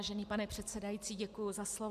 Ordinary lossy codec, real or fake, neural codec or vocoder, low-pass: MP3, 96 kbps; real; none; 14.4 kHz